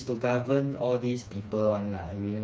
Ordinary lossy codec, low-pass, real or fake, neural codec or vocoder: none; none; fake; codec, 16 kHz, 2 kbps, FreqCodec, smaller model